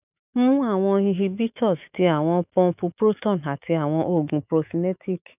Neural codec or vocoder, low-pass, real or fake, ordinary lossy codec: autoencoder, 48 kHz, 128 numbers a frame, DAC-VAE, trained on Japanese speech; 3.6 kHz; fake; none